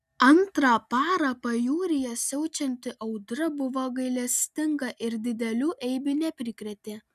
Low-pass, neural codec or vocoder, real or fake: 14.4 kHz; none; real